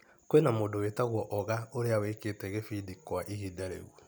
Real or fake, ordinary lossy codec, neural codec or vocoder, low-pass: real; none; none; none